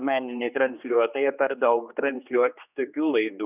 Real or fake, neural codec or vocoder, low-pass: fake; codec, 16 kHz, 2 kbps, X-Codec, HuBERT features, trained on general audio; 3.6 kHz